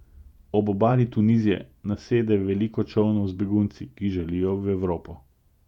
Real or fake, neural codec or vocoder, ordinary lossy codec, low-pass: fake; vocoder, 48 kHz, 128 mel bands, Vocos; none; 19.8 kHz